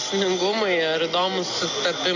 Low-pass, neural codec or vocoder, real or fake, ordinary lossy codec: 7.2 kHz; none; real; AAC, 48 kbps